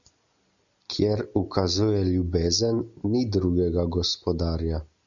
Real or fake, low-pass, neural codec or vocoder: real; 7.2 kHz; none